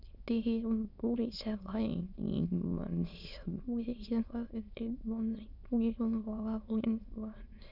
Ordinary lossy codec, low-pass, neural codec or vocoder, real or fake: none; 5.4 kHz; autoencoder, 22.05 kHz, a latent of 192 numbers a frame, VITS, trained on many speakers; fake